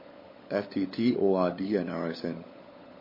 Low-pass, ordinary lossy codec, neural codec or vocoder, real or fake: 5.4 kHz; MP3, 24 kbps; codec, 16 kHz, 16 kbps, FunCodec, trained on LibriTTS, 50 frames a second; fake